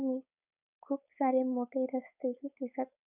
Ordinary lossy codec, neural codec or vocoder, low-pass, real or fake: none; codec, 16 kHz, 4.8 kbps, FACodec; 3.6 kHz; fake